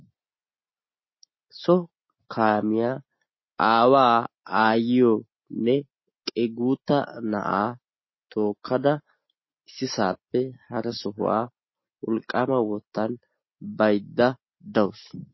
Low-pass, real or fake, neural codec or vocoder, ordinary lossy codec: 7.2 kHz; real; none; MP3, 24 kbps